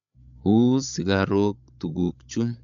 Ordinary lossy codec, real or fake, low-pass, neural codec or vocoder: none; fake; 7.2 kHz; codec, 16 kHz, 8 kbps, FreqCodec, larger model